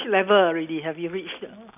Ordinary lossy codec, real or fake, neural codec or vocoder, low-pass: none; real; none; 3.6 kHz